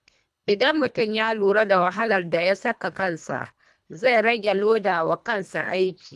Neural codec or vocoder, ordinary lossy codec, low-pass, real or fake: codec, 24 kHz, 1.5 kbps, HILCodec; none; none; fake